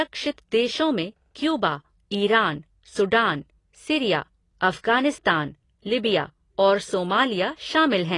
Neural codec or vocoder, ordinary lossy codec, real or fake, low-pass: none; AAC, 32 kbps; real; 10.8 kHz